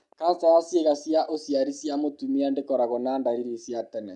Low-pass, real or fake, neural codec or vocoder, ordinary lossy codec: 10.8 kHz; real; none; none